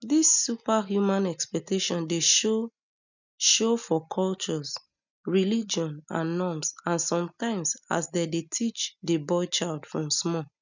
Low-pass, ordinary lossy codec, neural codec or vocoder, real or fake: 7.2 kHz; none; none; real